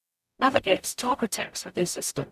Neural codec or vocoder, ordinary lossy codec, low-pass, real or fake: codec, 44.1 kHz, 0.9 kbps, DAC; none; 14.4 kHz; fake